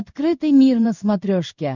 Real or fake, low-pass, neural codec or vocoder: fake; 7.2 kHz; codec, 16 kHz in and 24 kHz out, 1 kbps, XY-Tokenizer